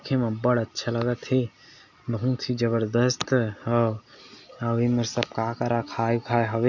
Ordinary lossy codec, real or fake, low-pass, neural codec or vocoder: none; real; 7.2 kHz; none